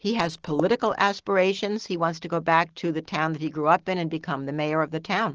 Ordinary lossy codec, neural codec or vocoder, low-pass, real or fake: Opus, 16 kbps; none; 7.2 kHz; real